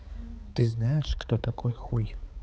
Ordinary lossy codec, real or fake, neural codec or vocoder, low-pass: none; fake; codec, 16 kHz, 4 kbps, X-Codec, HuBERT features, trained on balanced general audio; none